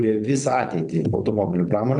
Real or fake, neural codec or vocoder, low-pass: fake; vocoder, 22.05 kHz, 80 mel bands, WaveNeXt; 9.9 kHz